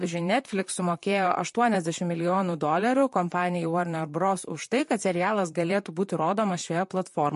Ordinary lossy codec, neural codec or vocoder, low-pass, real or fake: MP3, 48 kbps; vocoder, 44.1 kHz, 128 mel bands, Pupu-Vocoder; 14.4 kHz; fake